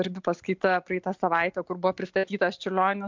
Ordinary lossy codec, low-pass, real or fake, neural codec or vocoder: MP3, 64 kbps; 7.2 kHz; real; none